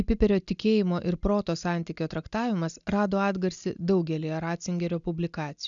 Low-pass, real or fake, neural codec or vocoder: 7.2 kHz; real; none